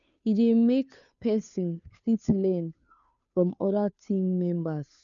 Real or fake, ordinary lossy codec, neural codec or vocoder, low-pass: fake; none; codec, 16 kHz, 2 kbps, FunCodec, trained on Chinese and English, 25 frames a second; 7.2 kHz